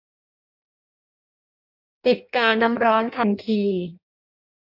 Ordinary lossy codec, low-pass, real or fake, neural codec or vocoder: AAC, 48 kbps; 5.4 kHz; fake; codec, 16 kHz in and 24 kHz out, 0.6 kbps, FireRedTTS-2 codec